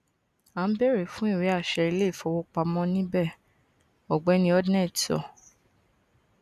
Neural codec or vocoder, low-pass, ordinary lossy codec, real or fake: none; 14.4 kHz; none; real